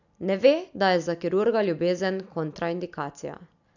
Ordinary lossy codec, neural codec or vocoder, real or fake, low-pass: none; none; real; 7.2 kHz